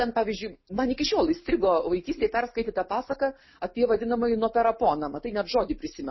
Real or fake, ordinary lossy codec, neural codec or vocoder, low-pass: real; MP3, 24 kbps; none; 7.2 kHz